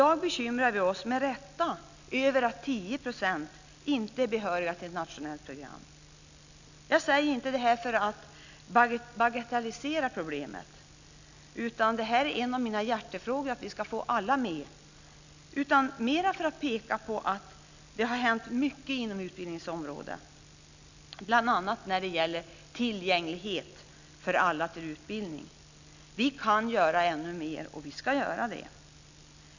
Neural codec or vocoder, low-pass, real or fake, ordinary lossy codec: none; 7.2 kHz; real; none